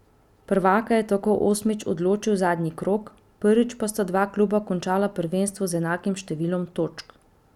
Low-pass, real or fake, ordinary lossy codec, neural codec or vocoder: 19.8 kHz; real; none; none